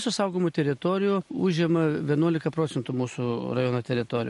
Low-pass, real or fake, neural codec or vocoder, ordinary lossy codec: 14.4 kHz; real; none; MP3, 48 kbps